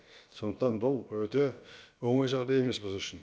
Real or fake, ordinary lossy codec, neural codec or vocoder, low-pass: fake; none; codec, 16 kHz, about 1 kbps, DyCAST, with the encoder's durations; none